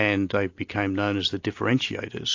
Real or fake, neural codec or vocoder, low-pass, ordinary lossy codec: real; none; 7.2 kHz; AAC, 48 kbps